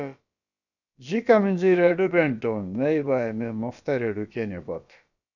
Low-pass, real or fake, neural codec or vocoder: 7.2 kHz; fake; codec, 16 kHz, about 1 kbps, DyCAST, with the encoder's durations